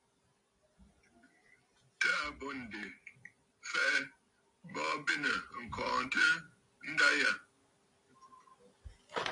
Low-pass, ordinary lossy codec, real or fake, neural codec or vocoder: 10.8 kHz; AAC, 64 kbps; real; none